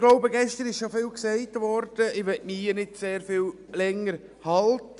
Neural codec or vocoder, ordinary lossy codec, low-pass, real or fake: none; none; 10.8 kHz; real